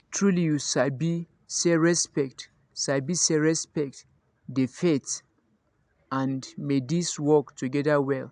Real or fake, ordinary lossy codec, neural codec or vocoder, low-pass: real; AAC, 96 kbps; none; 9.9 kHz